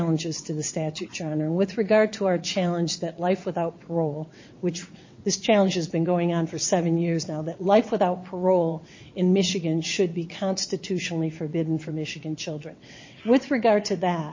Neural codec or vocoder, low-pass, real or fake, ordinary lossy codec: vocoder, 22.05 kHz, 80 mel bands, WaveNeXt; 7.2 kHz; fake; MP3, 32 kbps